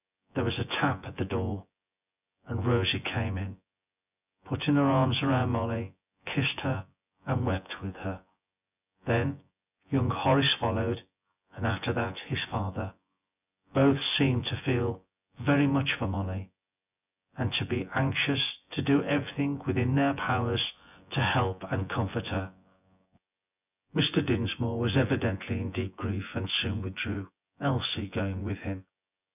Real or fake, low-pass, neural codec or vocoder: fake; 3.6 kHz; vocoder, 24 kHz, 100 mel bands, Vocos